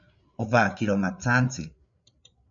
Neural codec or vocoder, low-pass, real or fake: codec, 16 kHz, 8 kbps, FreqCodec, larger model; 7.2 kHz; fake